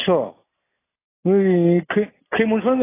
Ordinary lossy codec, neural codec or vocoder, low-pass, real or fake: AAC, 16 kbps; none; 3.6 kHz; real